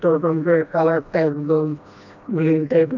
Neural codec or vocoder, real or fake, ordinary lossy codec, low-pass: codec, 16 kHz, 1 kbps, FreqCodec, smaller model; fake; none; 7.2 kHz